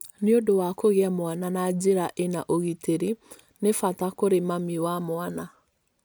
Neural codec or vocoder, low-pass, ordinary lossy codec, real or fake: none; none; none; real